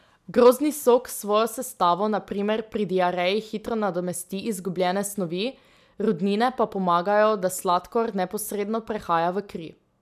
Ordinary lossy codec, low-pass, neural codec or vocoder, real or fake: none; 14.4 kHz; none; real